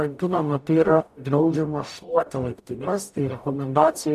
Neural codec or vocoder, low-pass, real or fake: codec, 44.1 kHz, 0.9 kbps, DAC; 14.4 kHz; fake